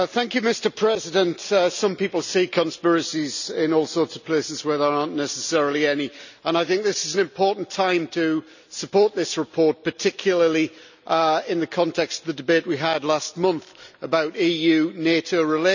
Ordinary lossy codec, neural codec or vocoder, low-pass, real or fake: none; none; 7.2 kHz; real